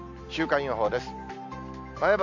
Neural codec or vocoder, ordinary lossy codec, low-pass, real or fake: none; none; 7.2 kHz; real